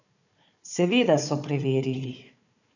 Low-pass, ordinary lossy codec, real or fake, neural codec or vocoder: 7.2 kHz; none; fake; codec, 16 kHz, 4 kbps, FunCodec, trained on Chinese and English, 50 frames a second